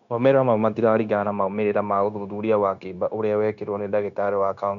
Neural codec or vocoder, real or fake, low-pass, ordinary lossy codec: codec, 16 kHz, 0.9 kbps, LongCat-Audio-Codec; fake; 7.2 kHz; none